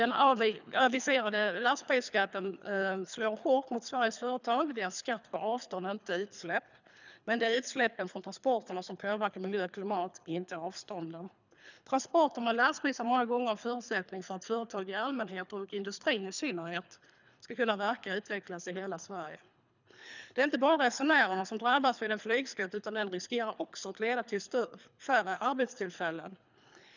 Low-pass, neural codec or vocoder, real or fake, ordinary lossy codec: 7.2 kHz; codec, 24 kHz, 3 kbps, HILCodec; fake; none